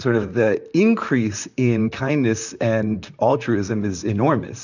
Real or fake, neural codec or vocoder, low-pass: fake; vocoder, 44.1 kHz, 128 mel bands, Pupu-Vocoder; 7.2 kHz